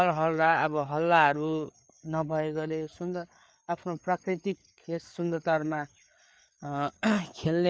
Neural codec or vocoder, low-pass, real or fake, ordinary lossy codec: codec, 16 kHz, 4 kbps, FreqCodec, larger model; none; fake; none